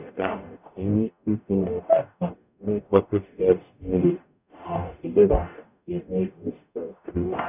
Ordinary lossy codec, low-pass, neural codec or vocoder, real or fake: none; 3.6 kHz; codec, 44.1 kHz, 0.9 kbps, DAC; fake